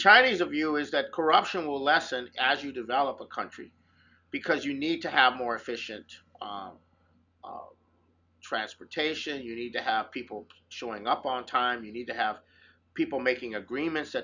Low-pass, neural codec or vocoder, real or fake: 7.2 kHz; none; real